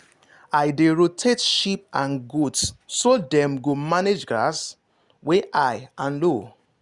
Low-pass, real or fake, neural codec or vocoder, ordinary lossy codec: 10.8 kHz; real; none; Opus, 64 kbps